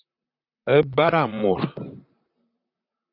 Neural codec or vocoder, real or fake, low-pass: vocoder, 44.1 kHz, 128 mel bands, Pupu-Vocoder; fake; 5.4 kHz